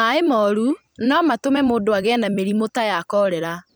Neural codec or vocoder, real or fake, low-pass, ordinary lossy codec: vocoder, 44.1 kHz, 128 mel bands every 256 samples, BigVGAN v2; fake; none; none